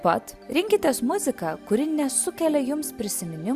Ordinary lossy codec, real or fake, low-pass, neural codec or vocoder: Opus, 64 kbps; real; 14.4 kHz; none